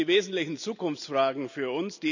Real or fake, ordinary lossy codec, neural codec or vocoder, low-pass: real; none; none; 7.2 kHz